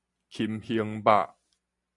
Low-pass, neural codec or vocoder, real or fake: 10.8 kHz; none; real